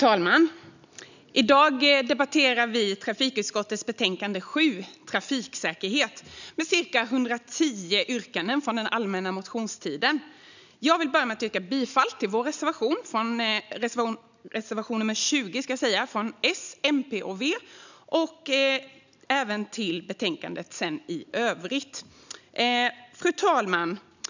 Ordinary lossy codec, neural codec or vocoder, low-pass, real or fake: none; none; 7.2 kHz; real